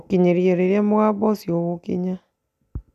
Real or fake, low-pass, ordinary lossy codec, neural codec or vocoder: real; 14.4 kHz; none; none